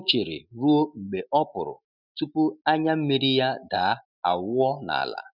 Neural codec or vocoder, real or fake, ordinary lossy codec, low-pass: none; real; none; 5.4 kHz